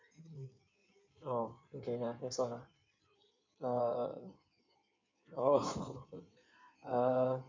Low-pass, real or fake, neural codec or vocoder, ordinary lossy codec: 7.2 kHz; fake; codec, 16 kHz in and 24 kHz out, 1.1 kbps, FireRedTTS-2 codec; MP3, 64 kbps